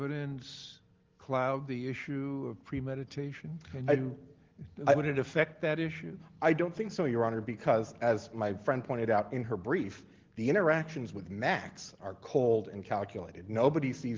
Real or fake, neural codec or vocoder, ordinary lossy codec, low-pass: real; none; Opus, 16 kbps; 7.2 kHz